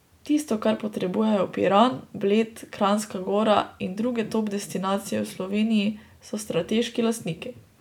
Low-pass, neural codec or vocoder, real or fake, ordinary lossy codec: 19.8 kHz; none; real; none